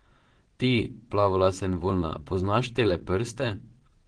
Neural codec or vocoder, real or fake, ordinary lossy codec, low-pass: vocoder, 22.05 kHz, 80 mel bands, WaveNeXt; fake; Opus, 16 kbps; 9.9 kHz